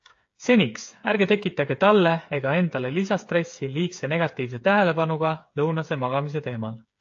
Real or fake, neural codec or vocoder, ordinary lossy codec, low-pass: fake; codec, 16 kHz, 8 kbps, FreqCodec, smaller model; AAC, 48 kbps; 7.2 kHz